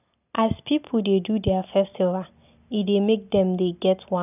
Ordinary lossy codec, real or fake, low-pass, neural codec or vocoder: none; real; 3.6 kHz; none